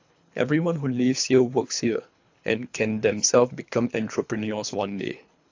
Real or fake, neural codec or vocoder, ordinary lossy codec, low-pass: fake; codec, 24 kHz, 3 kbps, HILCodec; AAC, 48 kbps; 7.2 kHz